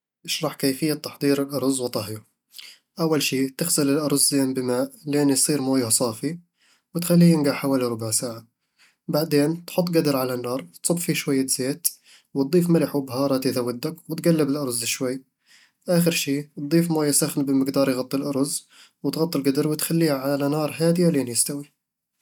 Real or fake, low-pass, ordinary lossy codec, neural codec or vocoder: real; 19.8 kHz; none; none